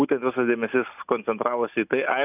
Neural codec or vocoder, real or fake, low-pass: none; real; 3.6 kHz